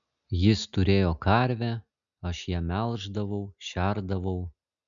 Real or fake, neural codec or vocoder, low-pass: real; none; 7.2 kHz